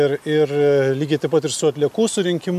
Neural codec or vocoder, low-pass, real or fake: none; 14.4 kHz; real